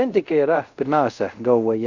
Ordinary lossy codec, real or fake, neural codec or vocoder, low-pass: Opus, 64 kbps; fake; codec, 24 kHz, 0.5 kbps, DualCodec; 7.2 kHz